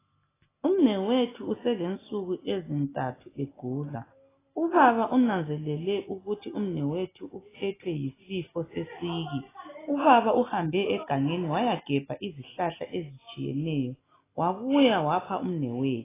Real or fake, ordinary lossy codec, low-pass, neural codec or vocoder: real; AAC, 16 kbps; 3.6 kHz; none